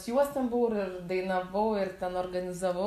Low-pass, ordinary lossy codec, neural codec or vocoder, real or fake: 14.4 kHz; AAC, 64 kbps; none; real